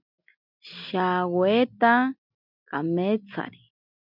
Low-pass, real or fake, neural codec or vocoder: 5.4 kHz; real; none